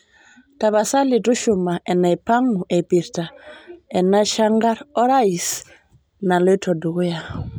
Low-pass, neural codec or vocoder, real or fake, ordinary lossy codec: none; none; real; none